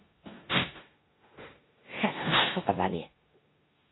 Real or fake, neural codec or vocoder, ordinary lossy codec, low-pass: fake; codec, 16 kHz in and 24 kHz out, 0.9 kbps, LongCat-Audio-Codec, four codebook decoder; AAC, 16 kbps; 7.2 kHz